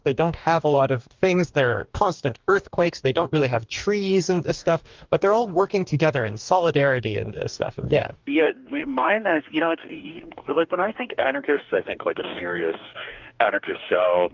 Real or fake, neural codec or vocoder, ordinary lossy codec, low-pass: fake; codec, 44.1 kHz, 2.6 kbps, DAC; Opus, 24 kbps; 7.2 kHz